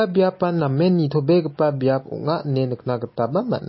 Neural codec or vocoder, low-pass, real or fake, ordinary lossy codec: none; 7.2 kHz; real; MP3, 24 kbps